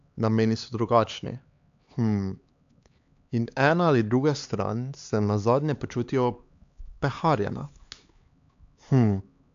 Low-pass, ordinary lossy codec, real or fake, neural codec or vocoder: 7.2 kHz; AAC, 64 kbps; fake; codec, 16 kHz, 4 kbps, X-Codec, HuBERT features, trained on LibriSpeech